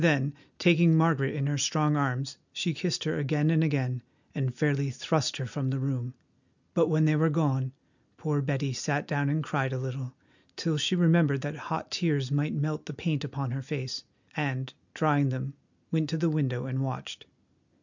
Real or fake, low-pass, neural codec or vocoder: real; 7.2 kHz; none